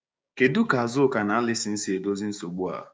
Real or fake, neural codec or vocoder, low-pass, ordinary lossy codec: fake; codec, 16 kHz, 6 kbps, DAC; none; none